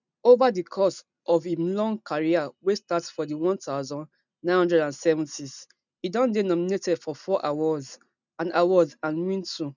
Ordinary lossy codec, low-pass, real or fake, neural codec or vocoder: none; 7.2 kHz; real; none